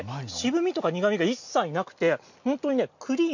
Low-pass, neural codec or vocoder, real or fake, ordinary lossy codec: 7.2 kHz; none; real; none